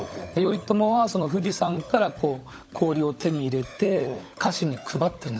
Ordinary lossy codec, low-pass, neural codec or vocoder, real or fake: none; none; codec, 16 kHz, 16 kbps, FunCodec, trained on LibriTTS, 50 frames a second; fake